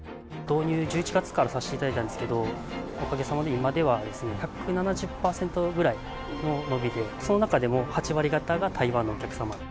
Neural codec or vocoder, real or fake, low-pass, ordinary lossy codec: none; real; none; none